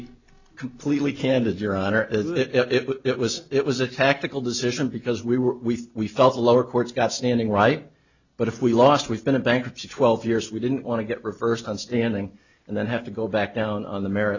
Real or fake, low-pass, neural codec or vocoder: real; 7.2 kHz; none